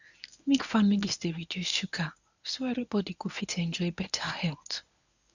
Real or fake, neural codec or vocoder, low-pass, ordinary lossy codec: fake; codec, 24 kHz, 0.9 kbps, WavTokenizer, medium speech release version 1; 7.2 kHz; none